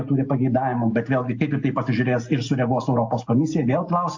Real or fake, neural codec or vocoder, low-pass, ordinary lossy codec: real; none; 7.2 kHz; AAC, 48 kbps